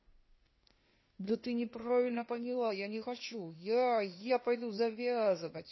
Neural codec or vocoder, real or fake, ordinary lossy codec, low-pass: codec, 16 kHz, 0.8 kbps, ZipCodec; fake; MP3, 24 kbps; 7.2 kHz